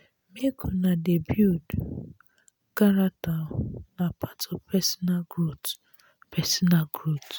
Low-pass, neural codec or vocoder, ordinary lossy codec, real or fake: none; none; none; real